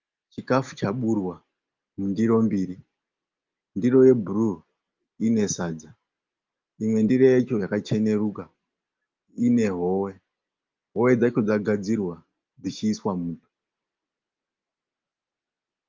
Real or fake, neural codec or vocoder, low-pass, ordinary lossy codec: real; none; 7.2 kHz; Opus, 32 kbps